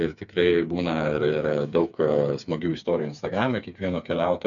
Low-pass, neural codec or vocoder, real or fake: 7.2 kHz; codec, 16 kHz, 4 kbps, FreqCodec, smaller model; fake